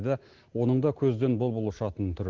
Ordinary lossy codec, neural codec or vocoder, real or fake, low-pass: Opus, 16 kbps; none; real; 7.2 kHz